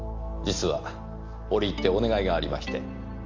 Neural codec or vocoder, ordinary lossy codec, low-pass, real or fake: none; Opus, 32 kbps; 7.2 kHz; real